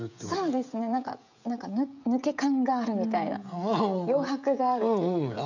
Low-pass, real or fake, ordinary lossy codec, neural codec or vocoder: 7.2 kHz; fake; none; codec, 16 kHz, 16 kbps, FreqCodec, smaller model